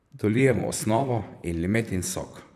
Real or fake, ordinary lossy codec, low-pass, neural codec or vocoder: fake; none; 14.4 kHz; vocoder, 44.1 kHz, 128 mel bands, Pupu-Vocoder